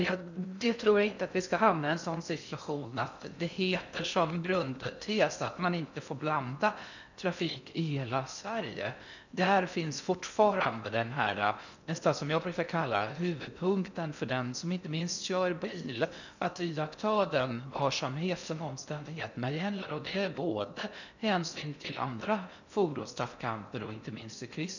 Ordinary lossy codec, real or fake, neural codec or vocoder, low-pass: none; fake; codec, 16 kHz in and 24 kHz out, 0.6 kbps, FocalCodec, streaming, 2048 codes; 7.2 kHz